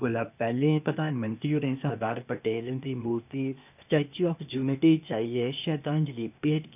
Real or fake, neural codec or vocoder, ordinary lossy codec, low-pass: fake; codec, 16 kHz, 0.8 kbps, ZipCodec; none; 3.6 kHz